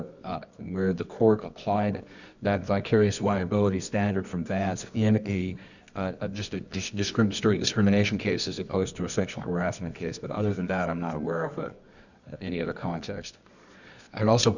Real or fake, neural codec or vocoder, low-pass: fake; codec, 24 kHz, 0.9 kbps, WavTokenizer, medium music audio release; 7.2 kHz